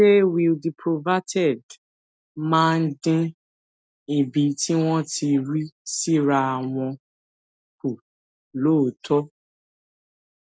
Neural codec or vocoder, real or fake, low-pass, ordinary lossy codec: none; real; none; none